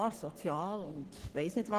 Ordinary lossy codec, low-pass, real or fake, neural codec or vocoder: Opus, 16 kbps; 14.4 kHz; fake; codec, 44.1 kHz, 3.4 kbps, Pupu-Codec